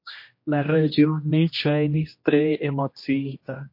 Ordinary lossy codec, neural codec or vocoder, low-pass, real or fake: MP3, 32 kbps; codec, 16 kHz, 1 kbps, X-Codec, HuBERT features, trained on general audio; 5.4 kHz; fake